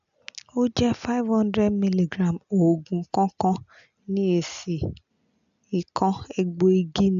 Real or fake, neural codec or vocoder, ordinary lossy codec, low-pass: real; none; AAC, 96 kbps; 7.2 kHz